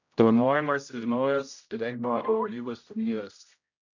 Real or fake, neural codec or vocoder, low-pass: fake; codec, 16 kHz, 0.5 kbps, X-Codec, HuBERT features, trained on general audio; 7.2 kHz